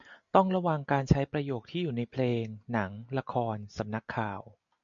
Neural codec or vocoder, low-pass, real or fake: none; 7.2 kHz; real